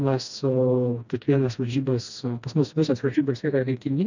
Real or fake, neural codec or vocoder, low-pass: fake; codec, 16 kHz, 1 kbps, FreqCodec, smaller model; 7.2 kHz